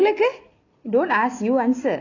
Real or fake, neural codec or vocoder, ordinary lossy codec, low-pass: real; none; none; 7.2 kHz